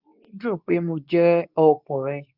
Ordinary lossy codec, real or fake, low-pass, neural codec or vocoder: none; fake; 5.4 kHz; codec, 24 kHz, 0.9 kbps, WavTokenizer, medium speech release version 2